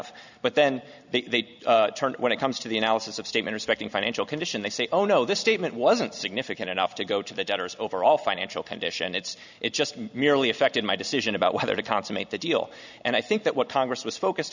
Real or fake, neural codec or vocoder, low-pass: real; none; 7.2 kHz